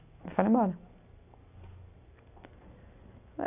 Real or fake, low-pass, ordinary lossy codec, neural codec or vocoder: real; 3.6 kHz; none; none